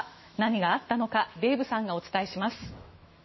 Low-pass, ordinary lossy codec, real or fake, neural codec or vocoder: 7.2 kHz; MP3, 24 kbps; fake; autoencoder, 48 kHz, 128 numbers a frame, DAC-VAE, trained on Japanese speech